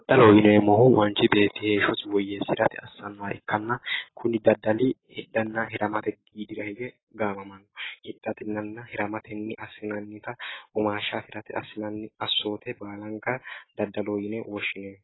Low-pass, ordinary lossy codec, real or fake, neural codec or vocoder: 7.2 kHz; AAC, 16 kbps; real; none